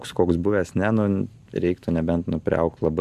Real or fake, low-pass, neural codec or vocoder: real; 14.4 kHz; none